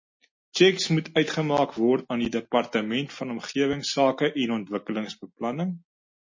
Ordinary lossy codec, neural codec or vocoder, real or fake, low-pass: MP3, 32 kbps; none; real; 7.2 kHz